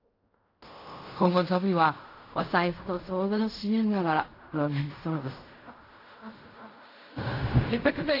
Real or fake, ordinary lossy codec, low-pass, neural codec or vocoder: fake; AAC, 32 kbps; 5.4 kHz; codec, 16 kHz in and 24 kHz out, 0.4 kbps, LongCat-Audio-Codec, fine tuned four codebook decoder